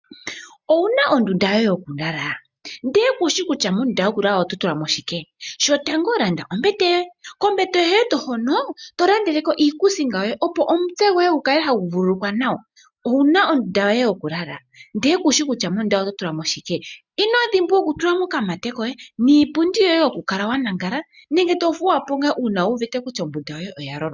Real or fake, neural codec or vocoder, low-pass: real; none; 7.2 kHz